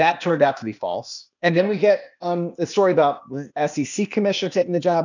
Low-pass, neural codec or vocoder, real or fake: 7.2 kHz; codec, 16 kHz, 0.8 kbps, ZipCodec; fake